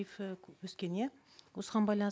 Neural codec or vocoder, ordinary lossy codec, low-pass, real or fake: none; none; none; real